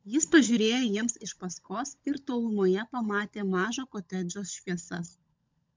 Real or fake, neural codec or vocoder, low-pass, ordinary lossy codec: fake; codec, 16 kHz, 16 kbps, FunCodec, trained on LibriTTS, 50 frames a second; 7.2 kHz; MP3, 64 kbps